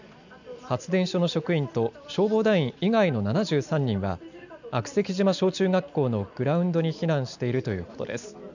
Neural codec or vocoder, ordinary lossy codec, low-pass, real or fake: none; none; 7.2 kHz; real